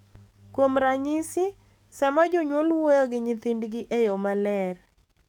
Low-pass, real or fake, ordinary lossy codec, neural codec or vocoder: 19.8 kHz; fake; none; codec, 44.1 kHz, 7.8 kbps, DAC